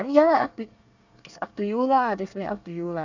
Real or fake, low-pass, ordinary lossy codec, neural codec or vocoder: fake; 7.2 kHz; none; codec, 24 kHz, 1 kbps, SNAC